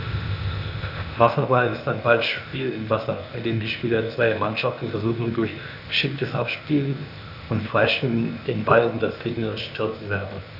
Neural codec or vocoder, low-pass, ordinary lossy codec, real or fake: codec, 16 kHz, 0.8 kbps, ZipCodec; 5.4 kHz; none; fake